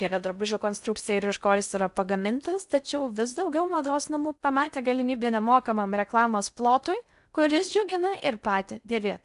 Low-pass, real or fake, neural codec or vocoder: 10.8 kHz; fake; codec, 16 kHz in and 24 kHz out, 0.6 kbps, FocalCodec, streaming, 4096 codes